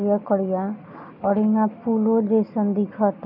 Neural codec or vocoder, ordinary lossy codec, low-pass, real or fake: none; none; 5.4 kHz; real